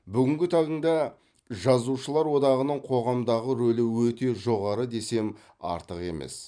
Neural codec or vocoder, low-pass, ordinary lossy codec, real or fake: vocoder, 44.1 kHz, 128 mel bands every 512 samples, BigVGAN v2; 9.9 kHz; none; fake